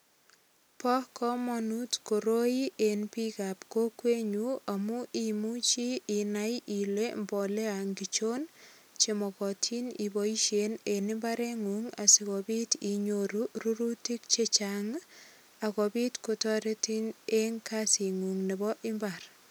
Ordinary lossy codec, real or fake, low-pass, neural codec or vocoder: none; real; none; none